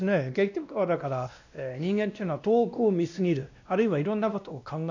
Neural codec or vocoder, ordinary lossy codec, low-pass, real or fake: codec, 16 kHz, 1 kbps, X-Codec, WavLM features, trained on Multilingual LibriSpeech; none; 7.2 kHz; fake